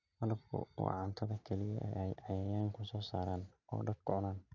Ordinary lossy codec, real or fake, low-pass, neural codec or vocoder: none; real; 7.2 kHz; none